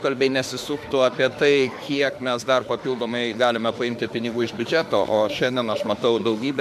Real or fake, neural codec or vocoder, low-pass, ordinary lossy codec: fake; autoencoder, 48 kHz, 32 numbers a frame, DAC-VAE, trained on Japanese speech; 14.4 kHz; AAC, 96 kbps